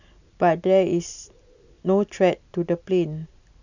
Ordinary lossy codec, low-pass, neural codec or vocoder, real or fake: none; 7.2 kHz; none; real